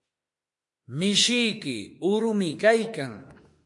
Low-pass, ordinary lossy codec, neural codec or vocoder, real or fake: 10.8 kHz; MP3, 48 kbps; autoencoder, 48 kHz, 32 numbers a frame, DAC-VAE, trained on Japanese speech; fake